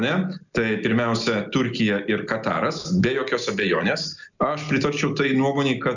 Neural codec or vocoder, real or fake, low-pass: none; real; 7.2 kHz